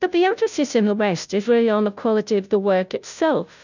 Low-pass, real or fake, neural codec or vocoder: 7.2 kHz; fake; codec, 16 kHz, 0.5 kbps, FunCodec, trained on Chinese and English, 25 frames a second